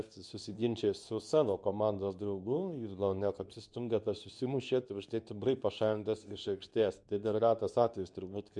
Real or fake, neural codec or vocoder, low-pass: fake; codec, 24 kHz, 0.9 kbps, WavTokenizer, medium speech release version 2; 10.8 kHz